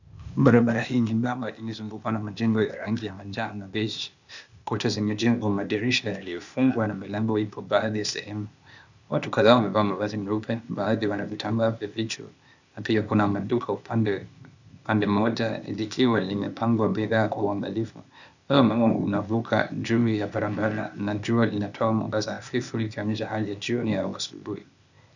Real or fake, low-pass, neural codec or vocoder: fake; 7.2 kHz; codec, 16 kHz, 0.8 kbps, ZipCodec